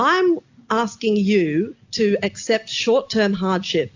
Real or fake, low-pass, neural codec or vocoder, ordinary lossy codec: real; 7.2 kHz; none; AAC, 48 kbps